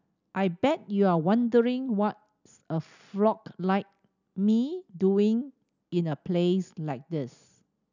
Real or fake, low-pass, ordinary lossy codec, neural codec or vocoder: real; 7.2 kHz; none; none